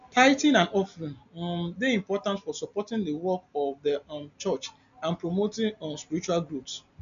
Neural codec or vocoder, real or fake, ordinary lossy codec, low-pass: none; real; none; 7.2 kHz